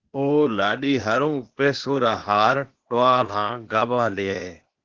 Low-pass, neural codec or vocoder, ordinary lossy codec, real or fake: 7.2 kHz; codec, 16 kHz, 0.8 kbps, ZipCodec; Opus, 16 kbps; fake